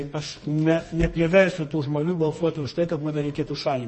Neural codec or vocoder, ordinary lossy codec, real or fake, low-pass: codec, 24 kHz, 0.9 kbps, WavTokenizer, medium music audio release; MP3, 32 kbps; fake; 10.8 kHz